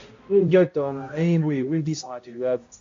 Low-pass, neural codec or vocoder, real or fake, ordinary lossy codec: 7.2 kHz; codec, 16 kHz, 0.5 kbps, X-Codec, HuBERT features, trained on balanced general audio; fake; AAC, 48 kbps